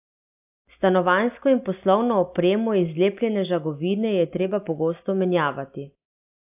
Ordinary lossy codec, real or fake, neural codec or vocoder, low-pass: AAC, 32 kbps; real; none; 3.6 kHz